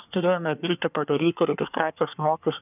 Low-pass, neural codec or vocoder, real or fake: 3.6 kHz; codec, 24 kHz, 1 kbps, SNAC; fake